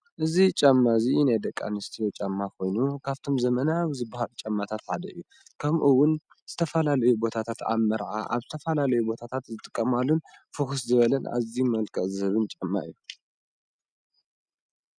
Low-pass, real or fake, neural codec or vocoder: 9.9 kHz; real; none